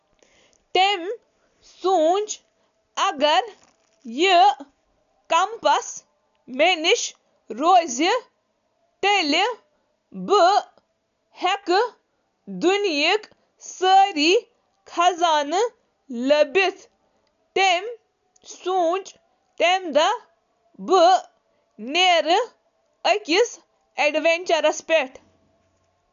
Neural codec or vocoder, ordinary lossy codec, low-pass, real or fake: none; none; 7.2 kHz; real